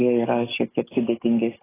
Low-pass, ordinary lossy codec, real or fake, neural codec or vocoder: 3.6 kHz; AAC, 16 kbps; fake; codec, 44.1 kHz, 7.8 kbps, DAC